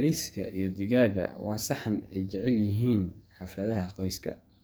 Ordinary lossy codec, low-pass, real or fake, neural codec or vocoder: none; none; fake; codec, 44.1 kHz, 2.6 kbps, SNAC